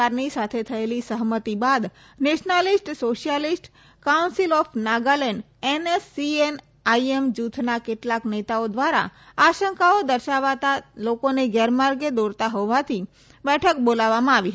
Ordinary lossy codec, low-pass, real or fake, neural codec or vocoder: none; none; real; none